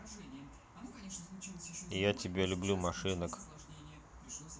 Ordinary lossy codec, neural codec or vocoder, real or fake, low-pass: none; none; real; none